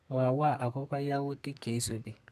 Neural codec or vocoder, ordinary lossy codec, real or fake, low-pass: codec, 32 kHz, 1.9 kbps, SNAC; none; fake; 14.4 kHz